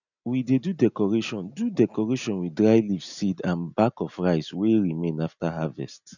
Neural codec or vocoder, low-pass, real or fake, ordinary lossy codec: none; 7.2 kHz; real; none